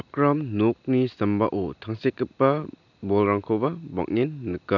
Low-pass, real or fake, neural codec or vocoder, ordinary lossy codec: 7.2 kHz; real; none; none